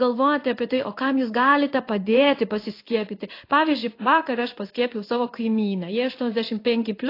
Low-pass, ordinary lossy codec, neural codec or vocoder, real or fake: 5.4 kHz; AAC, 32 kbps; codec, 16 kHz in and 24 kHz out, 1 kbps, XY-Tokenizer; fake